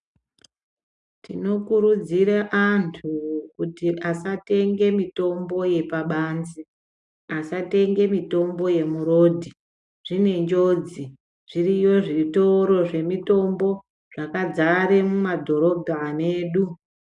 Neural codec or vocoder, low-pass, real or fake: none; 10.8 kHz; real